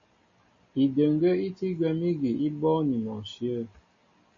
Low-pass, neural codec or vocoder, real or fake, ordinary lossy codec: 7.2 kHz; none; real; MP3, 32 kbps